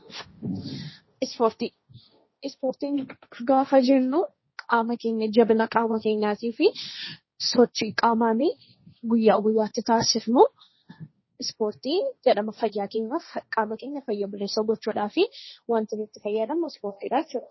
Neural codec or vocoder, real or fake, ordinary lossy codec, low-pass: codec, 16 kHz, 1.1 kbps, Voila-Tokenizer; fake; MP3, 24 kbps; 7.2 kHz